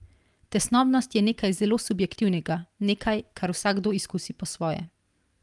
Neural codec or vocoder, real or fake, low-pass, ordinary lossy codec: none; real; 10.8 kHz; Opus, 32 kbps